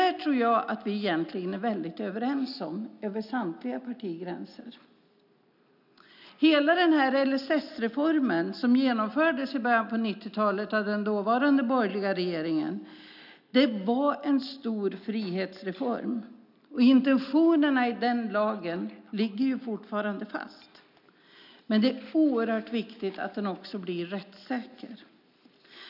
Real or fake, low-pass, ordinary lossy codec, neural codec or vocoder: real; 5.4 kHz; none; none